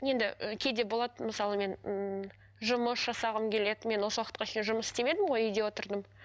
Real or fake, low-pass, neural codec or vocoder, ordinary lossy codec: real; none; none; none